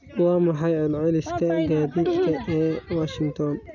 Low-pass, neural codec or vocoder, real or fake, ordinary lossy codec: 7.2 kHz; none; real; none